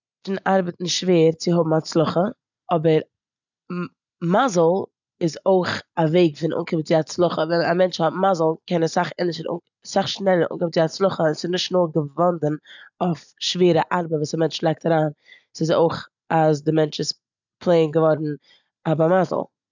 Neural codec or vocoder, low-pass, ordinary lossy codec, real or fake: none; 7.2 kHz; none; real